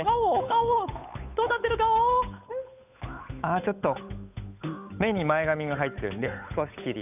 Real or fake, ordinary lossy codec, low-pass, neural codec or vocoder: fake; none; 3.6 kHz; codec, 16 kHz, 8 kbps, FunCodec, trained on Chinese and English, 25 frames a second